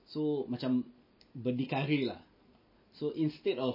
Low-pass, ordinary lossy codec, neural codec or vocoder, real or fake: 5.4 kHz; MP3, 24 kbps; none; real